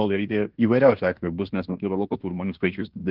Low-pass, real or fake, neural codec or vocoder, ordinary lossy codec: 5.4 kHz; fake; codec, 16 kHz in and 24 kHz out, 0.9 kbps, LongCat-Audio-Codec, fine tuned four codebook decoder; Opus, 16 kbps